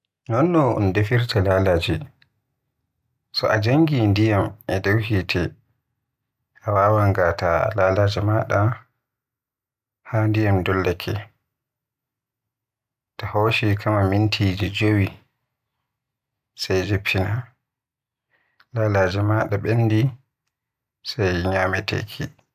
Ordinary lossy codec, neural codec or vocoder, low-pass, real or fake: none; none; 14.4 kHz; real